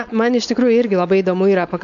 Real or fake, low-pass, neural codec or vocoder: fake; 7.2 kHz; codec, 16 kHz, 4.8 kbps, FACodec